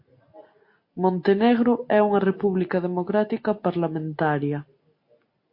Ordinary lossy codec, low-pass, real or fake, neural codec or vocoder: MP3, 32 kbps; 5.4 kHz; real; none